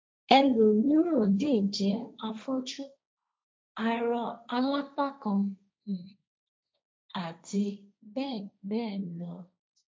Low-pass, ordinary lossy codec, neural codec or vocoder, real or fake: none; none; codec, 16 kHz, 1.1 kbps, Voila-Tokenizer; fake